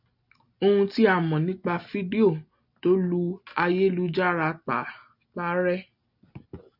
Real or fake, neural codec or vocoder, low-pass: real; none; 5.4 kHz